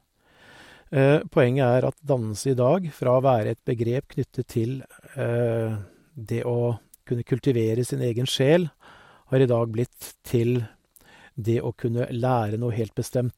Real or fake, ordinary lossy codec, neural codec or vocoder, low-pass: real; MP3, 64 kbps; none; 19.8 kHz